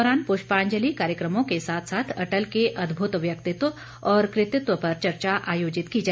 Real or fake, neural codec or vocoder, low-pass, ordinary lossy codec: real; none; none; none